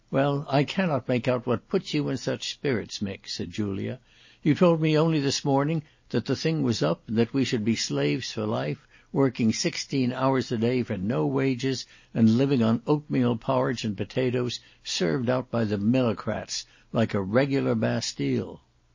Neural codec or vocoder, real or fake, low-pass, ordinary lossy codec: none; real; 7.2 kHz; MP3, 32 kbps